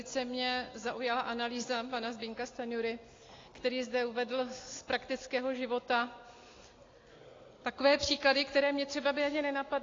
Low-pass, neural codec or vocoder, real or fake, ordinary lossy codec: 7.2 kHz; none; real; AAC, 32 kbps